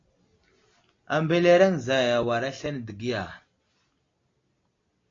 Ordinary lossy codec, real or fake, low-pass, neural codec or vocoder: AAC, 32 kbps; real; 7.2 kHz; none